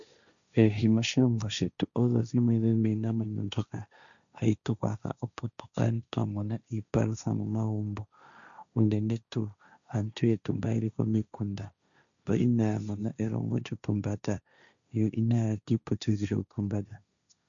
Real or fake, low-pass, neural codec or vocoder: fake; 7.2 kHz; codec, 16 kHz, 1.1 kbps, Voila-Tokenizer